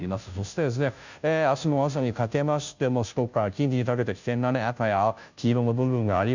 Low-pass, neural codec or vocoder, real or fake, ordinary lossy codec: 7.2 kHz; codec, 16 kHz, 0.5 kbps, FunCodec, trained on Chinese and English, 25 frames a second; fake; none